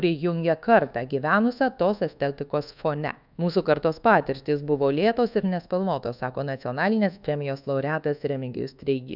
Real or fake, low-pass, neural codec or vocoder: fake; 5.4 kHz; codec, 24 kHz, 1.2 kbps, DualCodec